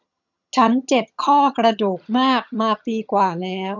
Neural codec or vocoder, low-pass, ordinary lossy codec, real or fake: vocoder, 22.05 kHz, 80 mel bands, HiFi-GAN; 7.2 kHz; none; fake